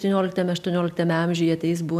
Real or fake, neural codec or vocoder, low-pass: real; none; 14.4 kHz